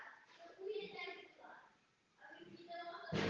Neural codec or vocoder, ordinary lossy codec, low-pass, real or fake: none; Opus, 16 kbps; 7.2 kHz; real